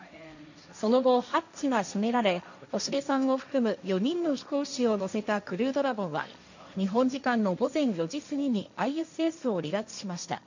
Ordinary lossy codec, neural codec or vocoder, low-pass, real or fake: none; codec, 16 kHz, 1.1 kbps, Voila-Tokenizer; 7.2 kHz; fake